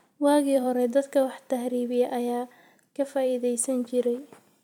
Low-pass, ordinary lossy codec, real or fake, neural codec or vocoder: 19.8 kHz; none; fake; vocoder, 44.1 kHz, 128 mel bands every 256 samples, BigVGAN v2